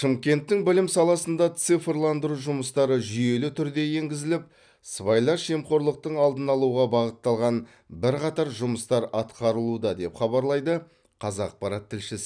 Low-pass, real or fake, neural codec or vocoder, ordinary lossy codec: 9.9 kHz; real; none; none